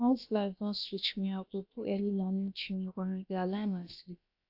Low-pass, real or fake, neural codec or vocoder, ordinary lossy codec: 5.4 kHz; fake; codec, 16 kHz, about 1 kbps, DyCAST, with the encoder's durations; AAC, 48 kbps